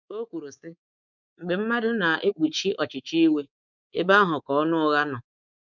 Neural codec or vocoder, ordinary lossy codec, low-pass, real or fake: codec, 24 kHz, 3.1 kbps, DualCodec; none; 7.2 kHz; fake